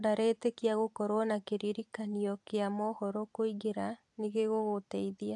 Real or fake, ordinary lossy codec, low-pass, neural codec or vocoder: fake; AAC, 64 kbps; 10.8 kHz; vocoder, 44.1 kHz, 128 mel bands every 256 samples, BigVGAN v2